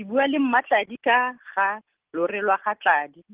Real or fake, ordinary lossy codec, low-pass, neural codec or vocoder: real; Opus, 16 kbps; 3.6 kHz; none